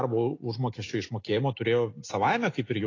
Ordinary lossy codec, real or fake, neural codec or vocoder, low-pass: AAC, 32 kbps; real; none; 7.2 kHz